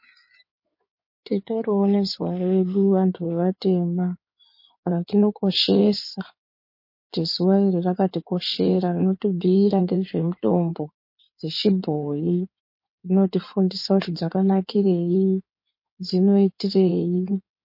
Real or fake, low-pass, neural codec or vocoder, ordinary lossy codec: fake; 5.4 kHz; codec, 16 kHz in and 24 kHz out, 2.2 kbps, FireRedTTS-2 codec; MP3, 32 kbps